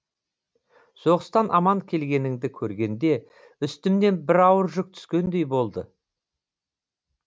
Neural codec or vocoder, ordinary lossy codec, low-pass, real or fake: none; none; none; real